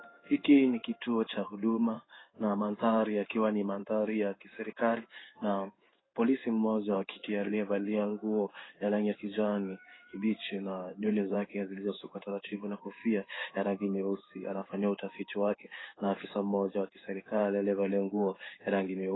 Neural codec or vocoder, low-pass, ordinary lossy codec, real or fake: codec, 16 kHz in and 24 kHz out, 1 kbps, XY-Tokenizer; 7.2 kHz; AAC, 16 kbps; fake